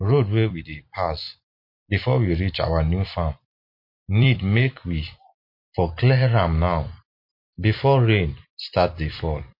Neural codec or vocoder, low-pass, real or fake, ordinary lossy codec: vocoder, 44.1 kHz, 128 mel bands every 256 samples, BigVGAN v2; 5.4 kHz; fake; MP3, 32 kbps